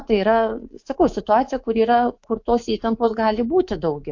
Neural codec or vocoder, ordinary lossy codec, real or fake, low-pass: codec, 24 kHz, 3.1 kbps, DualCodec; AAC, 48 kbps; fake; 7.2 kHz